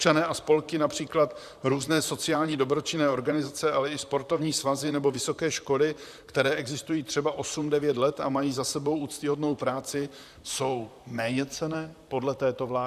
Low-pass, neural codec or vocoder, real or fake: 14.4 kHz; vocoder, 44.1 kHz, 128 mel bands, Pupu-Vocoder; fake